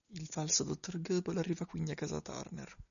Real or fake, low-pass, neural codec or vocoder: real; 7.2 kHz; none